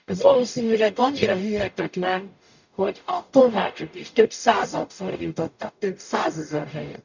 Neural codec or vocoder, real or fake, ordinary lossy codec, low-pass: codec, 44.1 kHz, 0.9 kbps, DAC; fake; none; 7.2 kHz